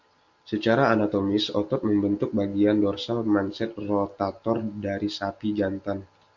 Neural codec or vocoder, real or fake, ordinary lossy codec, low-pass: none; real; Opus, 64 kbps; 7.2 kHz